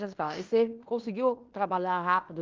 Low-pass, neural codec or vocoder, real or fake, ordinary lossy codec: 7.2 kHz; codec, 16 kHz in and 24 kHz out, 0.9 kbps, LongCat-Audio-Codec, fine tuned four codebook decoder; fake; Opus, 32 kbps